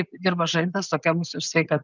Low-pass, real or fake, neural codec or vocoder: 7.2 kHz; real; none